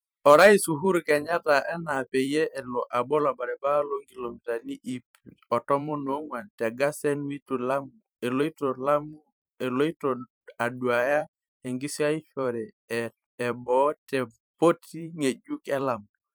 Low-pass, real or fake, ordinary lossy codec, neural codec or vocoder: none; fake; none; vocoder, 44.1 kHz, 128 mel bands every 512 samples, BigVGAN v2